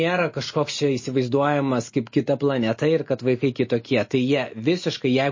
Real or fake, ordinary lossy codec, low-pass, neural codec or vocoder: real; MP3, 32 kbps; 7.2 kHz; none